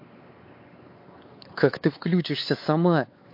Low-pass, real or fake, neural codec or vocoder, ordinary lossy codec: 5.4 kHz; fake; codec, 16 kHz, 4 kbps, X-Codec, WavLM features, trained on Multilingual LibriSpeech; MP3, 32 kbps